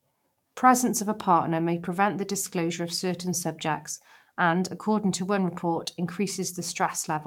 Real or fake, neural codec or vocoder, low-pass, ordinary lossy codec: fake; codec, 44.1 kHz, 7.8 kbps, DAC; 19.8 kHz; MP3, 96 kbps